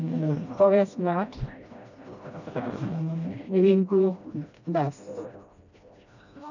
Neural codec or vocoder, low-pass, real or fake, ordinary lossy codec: codec, 16 kHz, 1 kbps, FreqCodec, smaller model; 7.2 kHz; fake; none